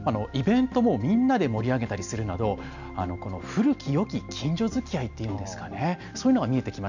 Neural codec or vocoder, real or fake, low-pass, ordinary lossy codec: none; real; 7.2 kHz; none